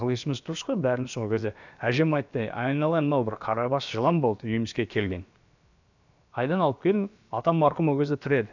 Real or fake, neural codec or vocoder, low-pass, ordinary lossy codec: fake; codec, 16 kHz, about 1 kbps, DyCAST, with the encoder's durations; 7.2 kHz; none